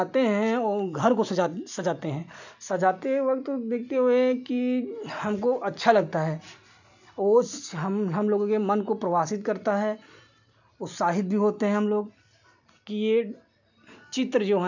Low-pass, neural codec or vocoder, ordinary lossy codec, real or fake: 7.2 kHz; none; none; real